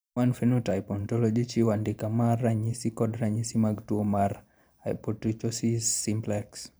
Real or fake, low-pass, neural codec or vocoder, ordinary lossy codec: fake; none; vocoder, 44.1 kHz, 128 mel bands every 512 samples, BigVGAN v2; none